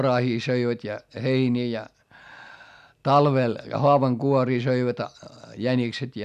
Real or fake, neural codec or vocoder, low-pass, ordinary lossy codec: real; none; 14.4 kHz; none